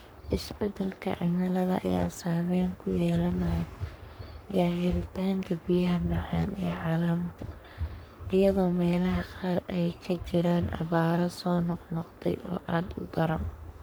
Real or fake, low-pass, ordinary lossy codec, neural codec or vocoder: fake; none; none; codec, 44.1 kHz, 3.4 kbps, Pupu-Codec